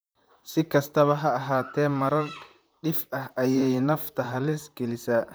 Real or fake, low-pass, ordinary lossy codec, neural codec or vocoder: fake; none; none; vocoder, 44.1 kHz, 128 mel bands, Pupu-Vocoder